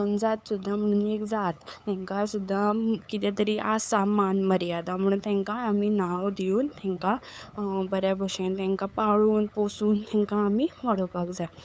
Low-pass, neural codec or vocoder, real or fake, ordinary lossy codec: none; codec, 16 kHz, 8 kbps, FunCodec, trained on LibriTTS, 25 frames a second; fake; none